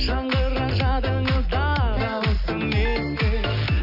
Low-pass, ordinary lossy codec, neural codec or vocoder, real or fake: 5.4 kHz; none; none; real